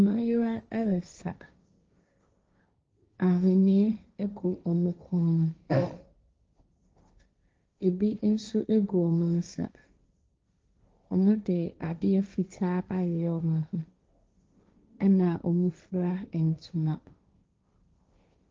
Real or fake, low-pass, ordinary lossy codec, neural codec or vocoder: fake; 7.2 kHz; Opus, 24 kbps; codec, 16 kHz, 1.1 kbps, Voila-Tokenizer